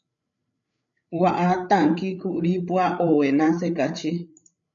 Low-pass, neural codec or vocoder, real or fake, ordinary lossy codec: 7.2 kHz; codec, 16 kHz, 8 kbps, FreqCodec, larger model; fake; MP3, 96 kbps